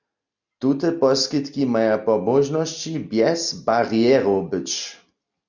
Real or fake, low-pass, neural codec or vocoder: real; 7.2 kHz; none